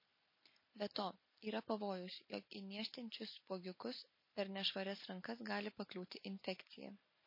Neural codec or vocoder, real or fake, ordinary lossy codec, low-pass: none; real; MP3, 24 kbps; 5.4 kHz